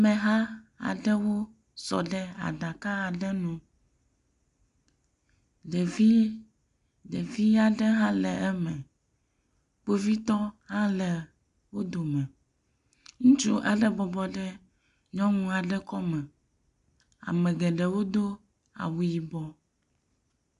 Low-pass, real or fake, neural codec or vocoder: 10.8 kHz; real; none